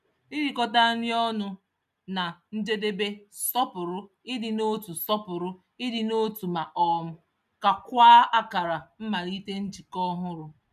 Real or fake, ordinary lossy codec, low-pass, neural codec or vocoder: real; none; 14.4 kHz; none